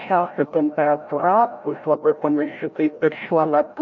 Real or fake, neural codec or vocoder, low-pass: fake; codec, 16 kHz, 0.5 kbps, FreqCodec, larger model; 7.2 kHz